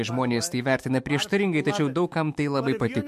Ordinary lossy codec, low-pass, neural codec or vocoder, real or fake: MP3, 64 kbps; 14.4 kHz; autoencoder, 48 kHz, 128 numbers a frame, DAC-VAE, trained on Japanese speech; fake